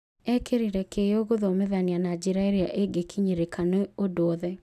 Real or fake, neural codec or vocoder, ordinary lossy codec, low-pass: real; none; none; 14.4 kHz